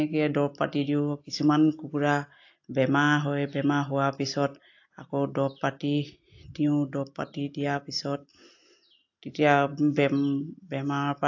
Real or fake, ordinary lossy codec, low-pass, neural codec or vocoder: real; AAC, 48 kbps; 7.2 kHz; none